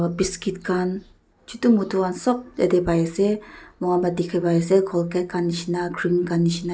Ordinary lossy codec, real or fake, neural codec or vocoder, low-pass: none; real; none; none